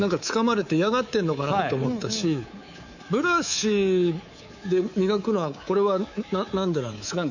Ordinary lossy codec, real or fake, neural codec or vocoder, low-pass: none; fake; codec, 24 kHz, 3.1 kbps, DualCodec; 7.2 kHz